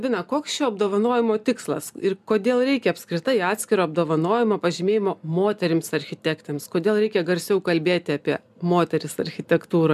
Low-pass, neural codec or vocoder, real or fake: 14.4 kHz; none; real